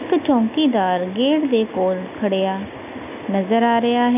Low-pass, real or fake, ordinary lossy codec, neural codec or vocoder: 3.6 kHz; real; none; none